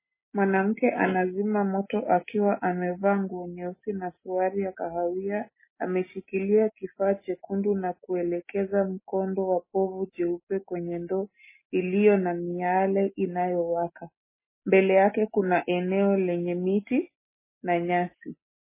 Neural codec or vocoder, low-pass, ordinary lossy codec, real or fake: none; 3.6 kHz; MP3, 16 kbps; real